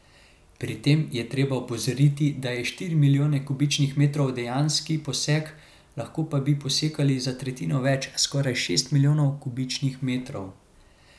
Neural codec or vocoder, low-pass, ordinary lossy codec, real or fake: none; none; none; real